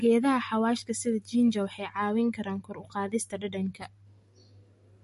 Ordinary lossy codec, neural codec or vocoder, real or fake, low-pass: MP3, 48 kbps; none; real; 14.4 kHz